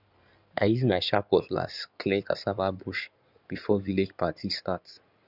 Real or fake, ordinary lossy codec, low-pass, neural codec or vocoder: fake; none; 5.4 kHz; codec, 16 kHz in and 24 kHz out, 2.2 kbps, FireRedTTS-2 codec